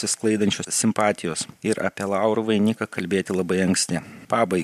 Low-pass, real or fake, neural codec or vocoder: 14.4 kHz; fake; vocoder, 44.1 kHz, 128 mel bands every 512 samples, BigVGAN v2